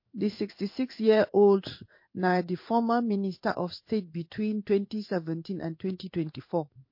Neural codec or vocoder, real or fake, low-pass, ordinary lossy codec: codec, 16 kHz in and 24 kHz out, 1 kbps, XY-Tokenizer; fake; 5.4 kHz; MP3, 32 kbps